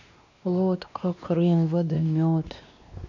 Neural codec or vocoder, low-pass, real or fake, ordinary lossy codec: codec, 16 kHz, 2 kbps, X-Codec, WavLM features, trained on Multilingual LibriSpeech; 7.2 kHz; fake; none